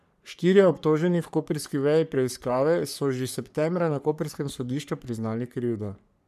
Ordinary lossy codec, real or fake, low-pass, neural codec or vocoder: none; fake; 14.4 kHz; codec, 44.1 kHz, 3.4 kbps, Pupu-Codec